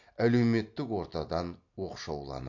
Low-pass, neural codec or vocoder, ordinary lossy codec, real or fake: 7.2 kHz; none; MP3, 48 kbps; real